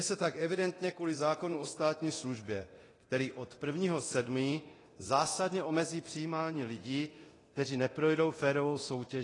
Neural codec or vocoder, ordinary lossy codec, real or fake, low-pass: codec, 24 kHz, 0.9 kbps, DualCodec; AAC, 32 kbps; fake; 10.8 kHz